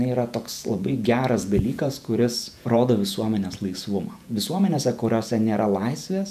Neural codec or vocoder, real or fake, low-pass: vocoder, 48 kHz, 128 mel bands, Vocos; fake; 14.4 kHz